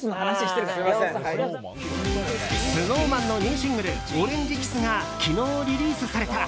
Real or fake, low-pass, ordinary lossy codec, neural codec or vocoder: real; none; none; none